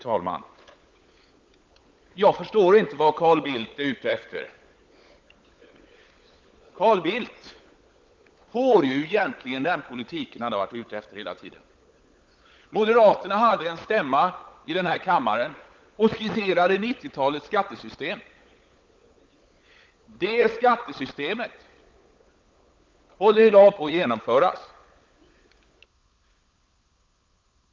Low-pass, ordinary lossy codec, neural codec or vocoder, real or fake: 7.2 kHz; Opus, 32 kbps; codec, 16 kHz, 16 kbps, FunCodec, trained on LibriTTS, 50 frames a second; fake